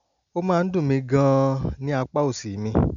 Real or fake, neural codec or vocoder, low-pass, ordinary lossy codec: real; none; 7.2 kHz; none